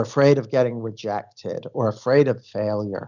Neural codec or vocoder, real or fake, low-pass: none; real; 7.2 kHz